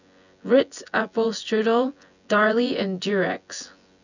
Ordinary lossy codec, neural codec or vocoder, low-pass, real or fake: none; vocoder, 24 kHz, 100 mel bands, Vocos; 7.2 kHz; fake